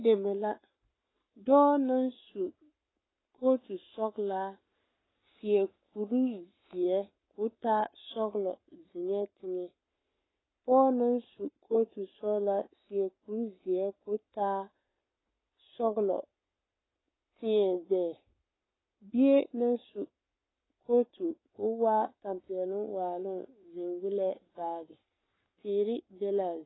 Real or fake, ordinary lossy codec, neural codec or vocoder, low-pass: fake; AAC, 16 kbps; codec, 44.1 kHz, 7.8 kbps, Pupu-Codec; 7.2 kHz